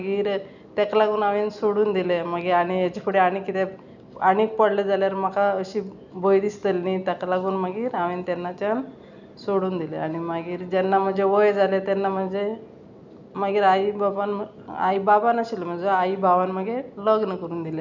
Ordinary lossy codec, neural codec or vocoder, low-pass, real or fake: none; none; 7.2 kHz; real